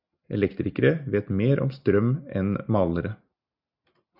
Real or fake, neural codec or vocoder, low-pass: real; none; 5.4 kHz